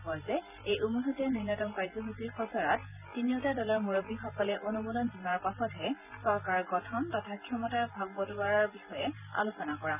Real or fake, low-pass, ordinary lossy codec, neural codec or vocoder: fake; 3.6 kHz; none; vocoder, 44.1 kHz, 128 mel bands every 512 samples, BigVGAN v2